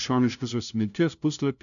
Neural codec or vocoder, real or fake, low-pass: codec, 16 kHz, 0.5 kbps, FunCodec, trained on LibriTTS, 25 frames a second; fake; 7.2 kHz